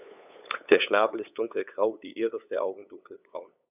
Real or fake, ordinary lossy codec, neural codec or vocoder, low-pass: fake; none; codec, 16 kHz, 8 kbps, FunCodec, trained on LibriTTS, 25 frames a second; 3.6 kHz